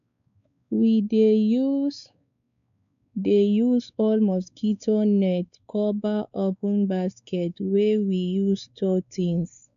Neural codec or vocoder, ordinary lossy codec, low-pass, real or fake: codec, 16 kHz, 4 kbps, X-Codec, WavLM features, trained on Multilingual LibriSpeech; none; 7.2 kHz; fake